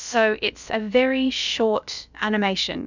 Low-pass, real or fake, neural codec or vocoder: 7.2 kHz; fake; codec, 16 kHz, about 1 kbps, DyCAST, with the encoder's durations